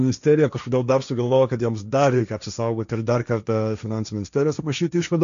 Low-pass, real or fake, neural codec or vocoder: 7.2 kHz; fake; codec, 16 kHz, 1.1 kbps, Voila-Tokenizer